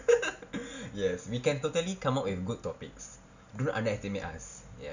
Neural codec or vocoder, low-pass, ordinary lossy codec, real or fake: none; 7.2 kHz; none; real